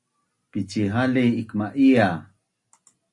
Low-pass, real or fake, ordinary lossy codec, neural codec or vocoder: 10.8 kHz; real; Opus, 64 kbps; none